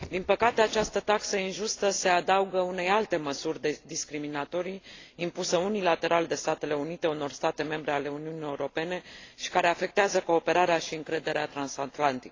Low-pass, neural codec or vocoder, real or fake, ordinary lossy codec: 7.2 kHz; none; real; AAC, 32 kbps